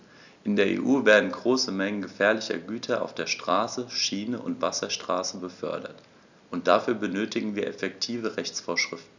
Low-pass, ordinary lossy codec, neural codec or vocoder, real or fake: 7.2 kHz; none; none; real